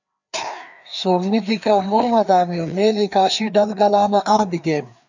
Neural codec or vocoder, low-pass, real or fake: codec, 16 kHz, 2 kbps, FreqCodec, larger model; 7.2 kHz; fake